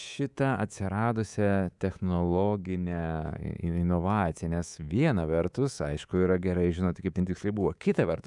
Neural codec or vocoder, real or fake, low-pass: codec, 24 kHz, 3.1 kbps, DualCodec; fake; 10.8 kHz